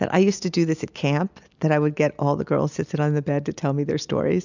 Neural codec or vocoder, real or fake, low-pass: none; real; 7.2 kHz